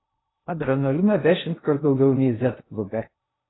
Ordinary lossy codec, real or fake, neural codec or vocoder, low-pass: AAC, 16 kbps; fake; codec, 16 kHz in and 24 kHz out, 0.6 kbps, FocalCodec, streaming, 2048 codes; 7.2 kHz